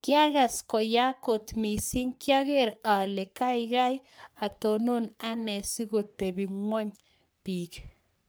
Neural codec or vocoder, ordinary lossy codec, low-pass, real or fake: codec, 44.1 kHz, 3.4 kbps, Pupu-Codec; none; none; fake